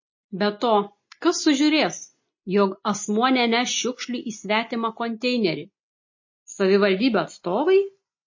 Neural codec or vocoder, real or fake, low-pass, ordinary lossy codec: none; real; 7.2 kHz; MP3, 32 kbps